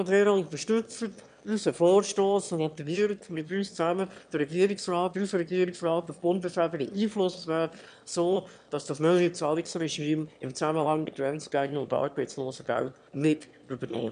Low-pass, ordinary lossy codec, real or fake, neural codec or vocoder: 9.9 kHz; none; fake; autoencoder, 22.05 kHz, a latent of 192 numbers a frame, VITS, trained on one speaker